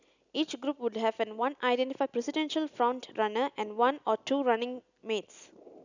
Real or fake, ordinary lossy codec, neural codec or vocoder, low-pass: real; none; none; 7.2 kHz